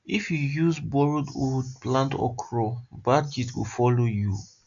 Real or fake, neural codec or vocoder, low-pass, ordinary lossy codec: real; none; 7.2 kHz; AAC, 64 kbps